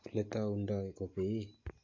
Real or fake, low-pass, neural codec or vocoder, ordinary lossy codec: real; 7.2 kHz; none; AAC, 48 kbps